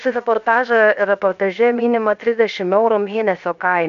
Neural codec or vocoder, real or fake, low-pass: codec, 16 kHz, 0.7 kbps, FocalCodec; fake; 7.2 kHz